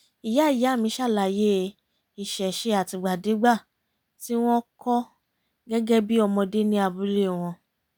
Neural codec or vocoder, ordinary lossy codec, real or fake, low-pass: none; none; real; none